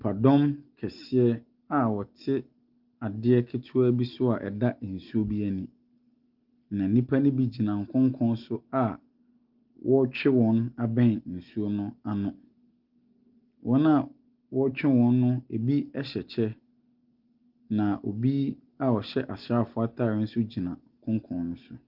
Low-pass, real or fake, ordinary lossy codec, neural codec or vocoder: 5.4 kHz; real; Opus, 32 kbps; none